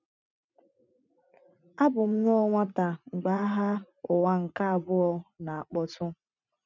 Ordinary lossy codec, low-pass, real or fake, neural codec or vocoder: none; 7.2 kHz; fake; vocoder, 44.1 kHz, 80 mel bands, Vocos